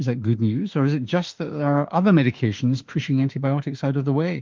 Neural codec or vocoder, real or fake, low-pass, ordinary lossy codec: autoencoder, 48 kHz, 32 numbers a frame, DAC-VAE, trained on Japanese speech; fake; 7.2 kHz; Opus, 32 kbps